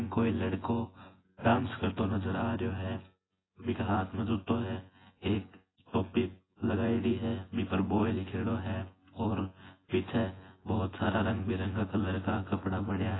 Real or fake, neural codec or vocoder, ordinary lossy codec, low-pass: fake; vocoder, 24 kHz, 100 mel bands, Vocos; AAC, 16 kbps; 7.2 kHz